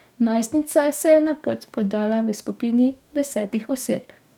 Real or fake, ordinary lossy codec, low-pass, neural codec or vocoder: fake; none; 19.8 kHz; codec, 44.1 kHz, 2.6 kbps, DAC